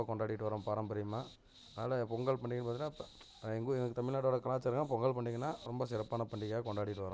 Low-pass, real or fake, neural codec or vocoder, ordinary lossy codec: none; real; none; none